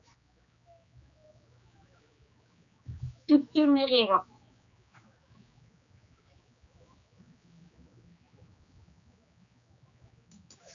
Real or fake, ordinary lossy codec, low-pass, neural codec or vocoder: fake; AAC, 64 kbps; 7.2 kHz; codec, 16 kHz, 2 kbps, X-Codec, HuBERT features, trained on general audio